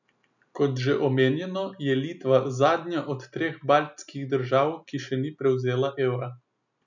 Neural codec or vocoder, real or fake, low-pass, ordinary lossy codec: none; real; 7.2 kHz; none